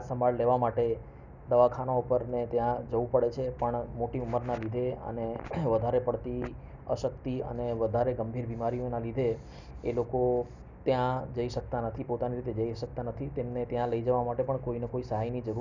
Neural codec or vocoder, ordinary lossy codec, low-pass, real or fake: none; none; 7.2 kHz; real